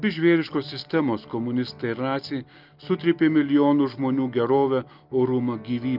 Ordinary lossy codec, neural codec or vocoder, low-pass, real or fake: Opus, 24 kbps; none; 5.4 kHz; real